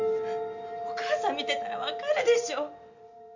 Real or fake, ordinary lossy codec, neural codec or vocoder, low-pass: real; MP3, 64 kbps; none; 7.2 kHz